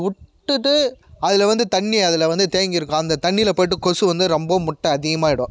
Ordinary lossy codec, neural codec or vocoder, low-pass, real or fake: none; none; none; real